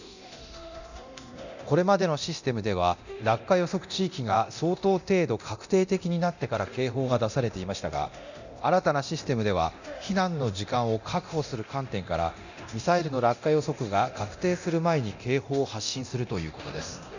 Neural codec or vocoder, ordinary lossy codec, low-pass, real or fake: codec, 24 kHz, 0.9 kbps, DualCodec; none; 7.2 kHz; fake